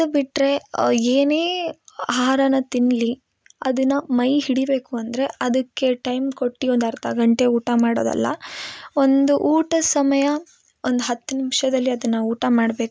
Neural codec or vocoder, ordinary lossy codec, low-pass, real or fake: none; none; none; real